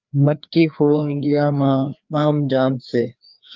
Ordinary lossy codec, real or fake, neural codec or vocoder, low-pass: Opus, 32 kbps; fake; codec, 16 kHz, 2 kbps, FreqCodec, larger model; 7.2 kHz